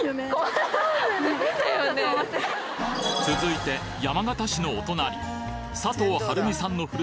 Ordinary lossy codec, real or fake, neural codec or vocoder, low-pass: none; real; none; none